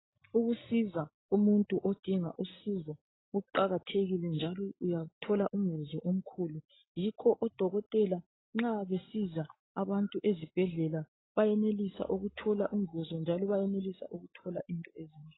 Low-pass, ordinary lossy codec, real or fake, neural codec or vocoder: 7.2 kHz; AAC, 16 kbps; real; none